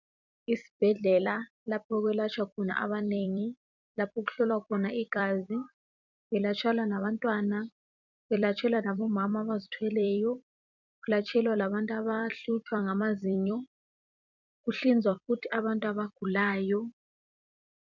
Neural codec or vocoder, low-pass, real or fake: vocoder, 44.1 kHz, 128 mel bands every 256 samples, BigVGAN v2; 7.2 kHz; fake